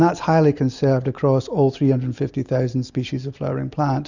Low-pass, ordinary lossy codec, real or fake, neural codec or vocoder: 7.2 kHz; Opus, 64 kbps; real; none